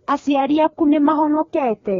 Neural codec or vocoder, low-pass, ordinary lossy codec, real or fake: codec, 16 kHz, 2 kbps, FreqCodec, larger model; 7.2 kHz; AAC, 32 kbps; fake